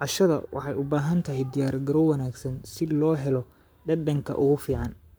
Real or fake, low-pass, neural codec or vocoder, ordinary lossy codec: fake; none; codec, 44.1 kHz, 7.8 kbps, Pupu-Codec; none